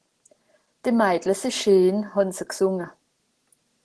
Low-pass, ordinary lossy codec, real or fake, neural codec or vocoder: 10.8 kHz; Opus, 16 kbps; real; none